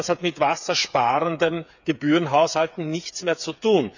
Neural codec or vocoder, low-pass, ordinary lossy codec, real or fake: codec, 16 kHz, 8 kbps, FreqCodec, smaller model; 7.2 kHz; none; fake